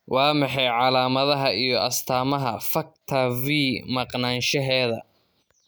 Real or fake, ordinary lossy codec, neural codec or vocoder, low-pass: real; none; none; none